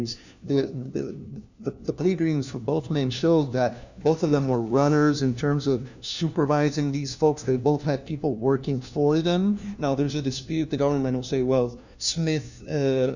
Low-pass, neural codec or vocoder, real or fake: 7.2 kHz; codec, 16 kHz, 1 kbps, FunCodec, trained on LibriTTS, 50 frames a second; fake